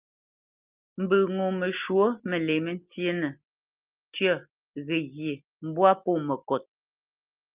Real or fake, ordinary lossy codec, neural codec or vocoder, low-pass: real; Opus, 24 kbps; none; 3.6 kHz